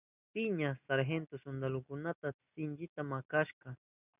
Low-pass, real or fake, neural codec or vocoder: 3.6 kHz; real; none